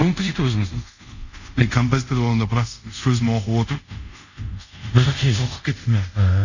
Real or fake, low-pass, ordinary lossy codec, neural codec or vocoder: fake; 7.2 kHz; none; codec, 24 kHz, 0.5 kbps, DualCodec